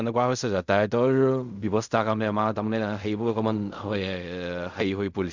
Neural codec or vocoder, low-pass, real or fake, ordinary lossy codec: codec, 16 kHz in and 24 kHz out, 0.4 kbps, LongCat-Audio-Codec, fine tuned four codebook decoder; 7.2 kHz; fake; Opus, 64 kbps